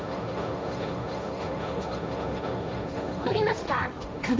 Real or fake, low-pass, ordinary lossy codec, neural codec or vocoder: fake; none; none; codec, 16 kHz, 1.1 kbps, Voila-Tokenizer